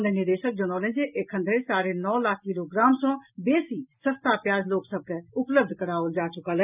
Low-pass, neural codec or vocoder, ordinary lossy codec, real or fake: 3.6 kHz; none; none; real